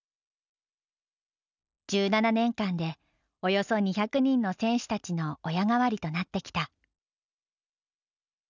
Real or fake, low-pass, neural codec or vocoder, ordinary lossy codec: real; 7.2 kHz; none; none